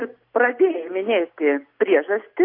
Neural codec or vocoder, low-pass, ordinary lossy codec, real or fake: none; 5.4 kHz; AAC, 32 kbps; real